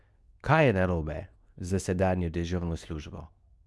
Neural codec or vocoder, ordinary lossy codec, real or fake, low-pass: codec, 24 kHz, 0.9 kbps, WavTokenizer, medium speech release version 2; none; fake; none